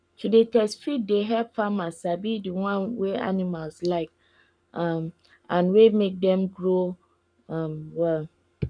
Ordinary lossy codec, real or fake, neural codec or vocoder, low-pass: none; fake; codec, 44.1 kHz, 7.8 kbps, Pupu-Codec; 9.9 kHz